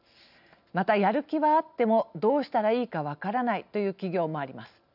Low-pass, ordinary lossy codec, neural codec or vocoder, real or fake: 5.4 kHz; none; none; real